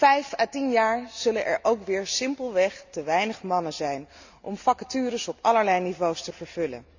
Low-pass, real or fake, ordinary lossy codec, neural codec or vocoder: 7.2 kHz; real; Opus, 64 kbps; none